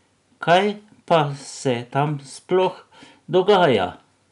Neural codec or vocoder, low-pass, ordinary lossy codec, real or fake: none; 10.8 kHz; none; real